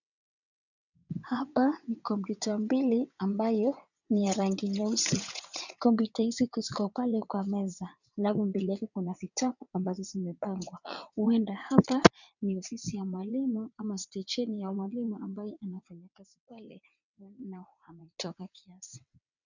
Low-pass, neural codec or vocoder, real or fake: 7.2 kHz; vocoder, 22.05 kHz, 80 mel bands, WaveNeXt; fake